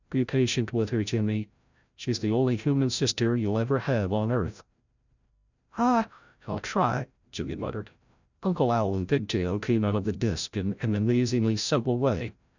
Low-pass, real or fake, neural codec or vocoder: 7.2 kHz; fake; codec, 16 kHz, 0.5 kbps, FreqCodec, larger model